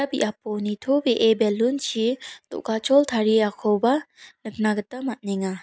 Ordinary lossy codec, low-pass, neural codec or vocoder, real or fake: none; none; none; real